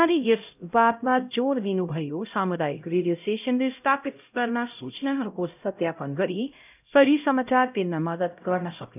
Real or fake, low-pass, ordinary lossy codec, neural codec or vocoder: fake; 3.6 kHz; none; codec, 16 kHz, 0.5 kbps, X-Codec, HuBERT features, trained on LibriSpeech